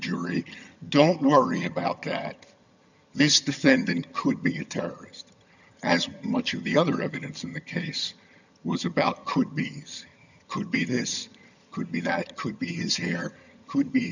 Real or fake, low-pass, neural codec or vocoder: fake; 7.2 kHz; vocoder, 22.05 kHz, 80 mel bands, HiFi-GAN